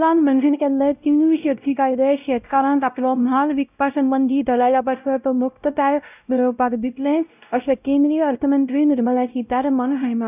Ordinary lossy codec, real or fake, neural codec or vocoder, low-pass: none; fake; codec, 16 kHz, 0.5 kbps, X-Codec, WavLM features, trained on Multilingual LibriSpeech; 3.6 kHz